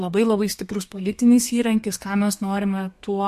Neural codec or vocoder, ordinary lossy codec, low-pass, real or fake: codec, 32 kHz, 1.9 kbps, SNAC; MP3, 64 kbps; 14.4 kHz; fake